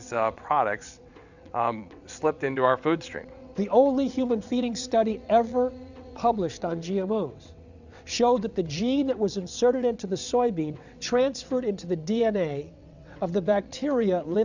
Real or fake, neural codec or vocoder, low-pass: fake; vocoder, 22.05 kHz, 80 mel bands, WaveNeXt; 7.2 kHz